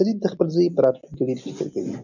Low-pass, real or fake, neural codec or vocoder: 7.2 kHz; real; none